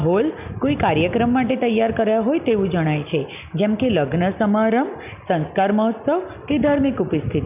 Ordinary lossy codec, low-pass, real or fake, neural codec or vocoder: none; 3.6 kHz; real; none